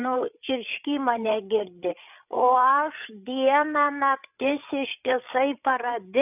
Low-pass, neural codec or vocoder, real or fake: 3.6 kHz; codec, 16 kHz, 8 kbps, FreqCodec, larger model; fake